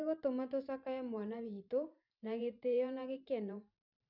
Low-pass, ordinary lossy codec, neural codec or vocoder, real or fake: 5.4 kHz; none; vocoder, 44.1 kHz, 128 mel bands every 512 samples, BigVGAN v2; fake